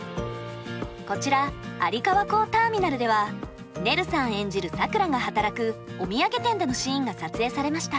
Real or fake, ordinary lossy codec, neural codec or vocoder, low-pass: real; none; none; none